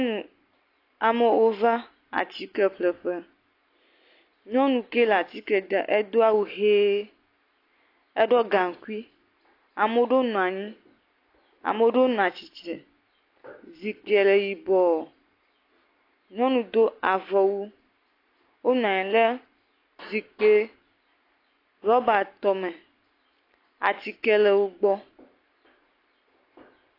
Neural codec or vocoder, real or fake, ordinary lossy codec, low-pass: none; real; AAC, 32 kbps; 5.4 kHz